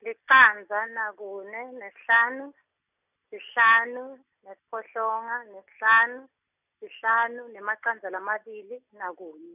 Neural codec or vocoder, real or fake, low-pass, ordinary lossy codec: none; real; 3.6 kHz; none